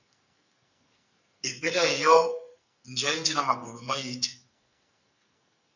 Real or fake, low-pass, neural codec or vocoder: fake; 7.2 kHz; codec, 44.1 kHz, 2.6 kbps, SNAC